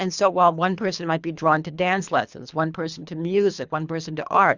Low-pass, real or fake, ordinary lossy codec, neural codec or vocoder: 7.2 kHz; fake; Opus, 64 kbps; codec, 24 kHz, 3 kbps, HILCodec